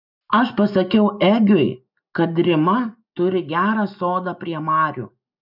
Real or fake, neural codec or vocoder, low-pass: real; none; 5.4 kHz